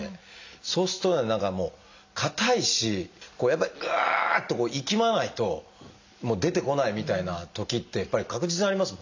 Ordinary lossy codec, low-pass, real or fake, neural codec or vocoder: AAC, 48 kbps; 7.2 kHz; real; none